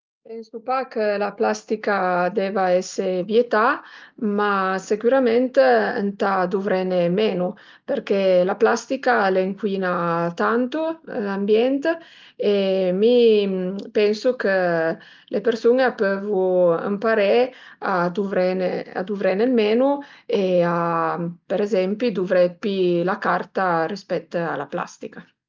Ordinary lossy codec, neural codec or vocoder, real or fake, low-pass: Opus, 32 kbps; none; real; 7.2 kHz